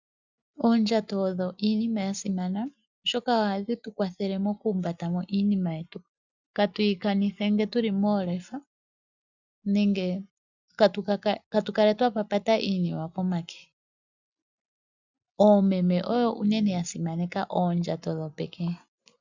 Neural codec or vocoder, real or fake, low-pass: none; real; 7.2 kHz